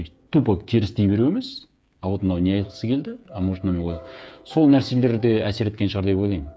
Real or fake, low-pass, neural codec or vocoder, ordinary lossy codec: fake; none; codec, 16 kHz, 8 kbps, FreqCodec, smaller model; none